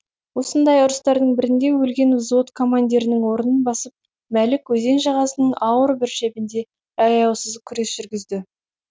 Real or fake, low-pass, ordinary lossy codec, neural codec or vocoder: real; none; none; none